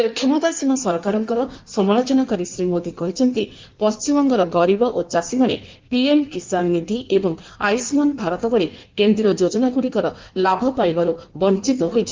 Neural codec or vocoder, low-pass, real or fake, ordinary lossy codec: codec, 16 kHz in and 24 kHz out, 1.1 kbps, FireRedTTS-2 codec; 7.2 kHz; fake; Opus, 32 kbps